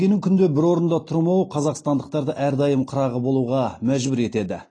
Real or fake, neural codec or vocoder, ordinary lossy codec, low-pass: real; none; AAC, 32 kbps; 9.9 kHz